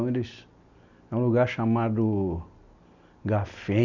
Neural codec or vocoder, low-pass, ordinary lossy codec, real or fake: none; 7.2 kHz; none; real